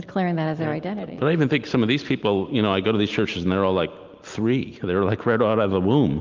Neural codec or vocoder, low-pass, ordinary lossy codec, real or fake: none; 7.2 kHz; Opus, 32 kbps; real